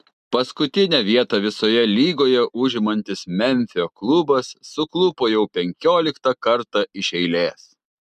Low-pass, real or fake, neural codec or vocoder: 14.4 kHz; real; none